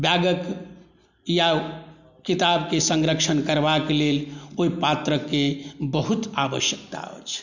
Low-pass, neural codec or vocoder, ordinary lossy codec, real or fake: 7.2 kHz; none; none; real